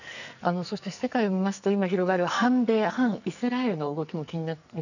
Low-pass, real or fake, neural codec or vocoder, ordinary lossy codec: 7.2 kHz; fake; codec, 44.1 kHz, 2.6 kbps, SNAC; none